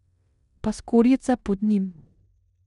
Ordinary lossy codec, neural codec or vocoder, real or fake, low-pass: none; codec, 16 kHz in and 24 kHz out, 0.9 kbps, LongCat-Audio-Codec, four codebook decoder; fake; 10.8 kHz